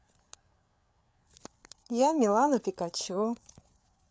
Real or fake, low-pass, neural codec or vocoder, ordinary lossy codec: fake; none; codec, 16 kHz, 8 kbps, FreqCodec, larger model; none